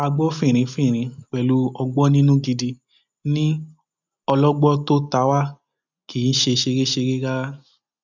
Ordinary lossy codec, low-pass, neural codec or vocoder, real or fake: none; 7.2 kHz; none; real